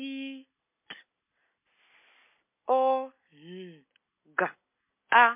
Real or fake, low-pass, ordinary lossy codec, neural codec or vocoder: real; 3.6 kHz; MP3, 24 kbps; none